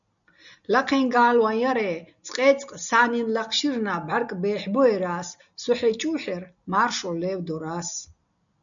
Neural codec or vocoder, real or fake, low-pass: none; real; 7.2 kHz